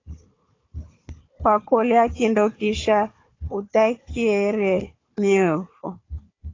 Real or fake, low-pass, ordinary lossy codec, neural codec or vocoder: fake; 7.2 kHz; AAC, 32 kbps; codec, 16 kHz, 4 kbps, FunCodec, trained on Chinese and English, 50 frames a second